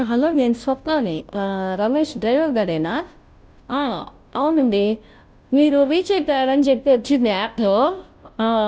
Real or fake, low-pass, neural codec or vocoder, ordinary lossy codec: fake; none; codec, 16 kHz, 0.5 kbps, FunCodec, trained on Chinese and English, 25 frames a second; none